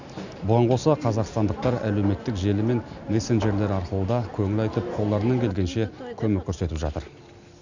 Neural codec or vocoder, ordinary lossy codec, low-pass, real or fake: none; none; 7.2 kHz; real